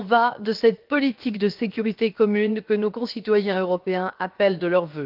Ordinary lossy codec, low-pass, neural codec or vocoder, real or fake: Opus, 32 kbps; 5.4 kHz; codec, 16 kHz, 0.7 kbps, FocalCodec; fake